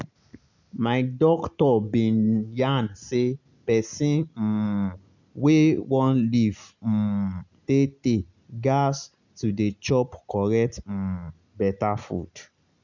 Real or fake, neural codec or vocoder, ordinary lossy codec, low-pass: real; none; none; 7.2 kHz